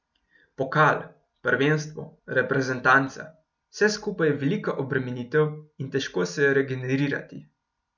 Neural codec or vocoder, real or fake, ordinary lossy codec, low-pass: none; real; none; 7.2 kHz